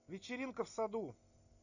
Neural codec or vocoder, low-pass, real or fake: none; 7.2 kHz; real